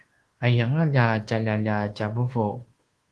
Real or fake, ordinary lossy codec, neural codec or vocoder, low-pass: fake; Opus, 16 kbps; codec, 24 kHz, 1.2 kbps, DualCodec; 10.8 kHz